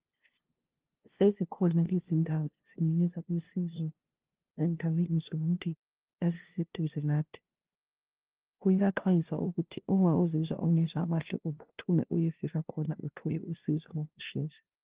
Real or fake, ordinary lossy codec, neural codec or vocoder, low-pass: fake; Opus, 16 kbps; codec, 16 kHz, 0.5 kbps, FunCodec, trained on LibriTTS, 25 frames a second; 3.6 kHz